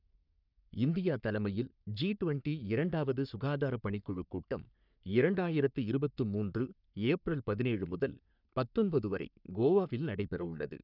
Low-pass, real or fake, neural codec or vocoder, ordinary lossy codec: 5.4 kHz; fake; codec, 44.1 kHz, 3.4 kbps, Pupu-Codec; none